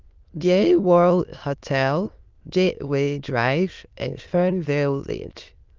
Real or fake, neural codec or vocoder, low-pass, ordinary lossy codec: fake; autoencoder, 22.05 kHz, a latent of 192 numbers a frame, VITS, trained on many speakers; 7.2 kHz; Opus, 24 kbps